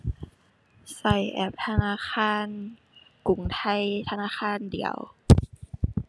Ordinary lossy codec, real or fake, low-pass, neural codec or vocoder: none; real; none; none